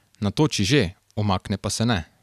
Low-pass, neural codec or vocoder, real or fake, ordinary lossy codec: 14.4 kHz; none; real; none